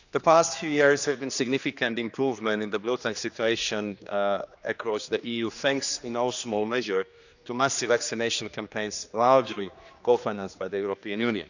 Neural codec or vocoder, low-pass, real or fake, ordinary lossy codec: codec, 16 kHz, 2 kbps, X-Codec, HuBERT features, trained on general audio; 7.2 kHz; fake; none